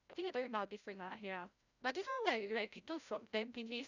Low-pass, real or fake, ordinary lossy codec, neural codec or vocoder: 7.2 kHz; fake; none; codec, 16 kHz, 0.5 kbps, FreqCodec, larger model